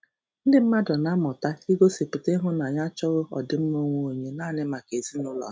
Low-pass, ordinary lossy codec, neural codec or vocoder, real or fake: none; none; none; real